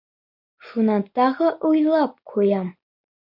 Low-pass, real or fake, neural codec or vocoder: 5.4 kHz; real; none